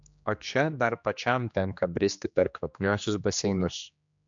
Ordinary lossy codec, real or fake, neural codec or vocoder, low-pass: MP3, 64 kbps; fake; codec, 16 kHz, 2 kbps, X-Codec, HuBERT features, trained on general audio; 7.2 kHz